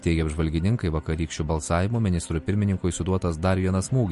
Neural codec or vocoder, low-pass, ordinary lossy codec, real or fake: none; 14.4 kHz; MP3, 48 kbps; real